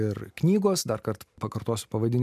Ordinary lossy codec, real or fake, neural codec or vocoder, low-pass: MP3, 96 kbps; real; none; 14.4 kHz